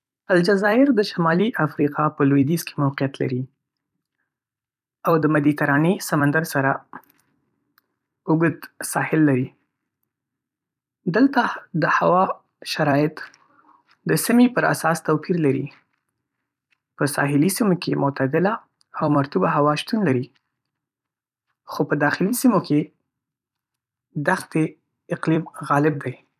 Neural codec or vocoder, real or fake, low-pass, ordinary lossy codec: vocoder, 44.1 kHz, 128 mel bands every 256 samples, BigVGAN v2; fake; 14.4 kHz; none